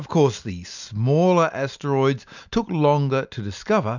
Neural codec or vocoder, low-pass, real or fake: none; 7.2 kHz; real